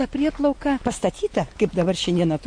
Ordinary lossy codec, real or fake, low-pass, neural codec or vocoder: MP3, 48 kbps; real; 9.9 kHz; none